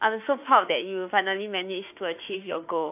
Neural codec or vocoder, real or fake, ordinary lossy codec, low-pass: autoencoder, 48 kHz, 32 numbers a frame, DAC-VAE, trained on Japanese speech; fake; AAC, 32 kbps; 3.6 kHz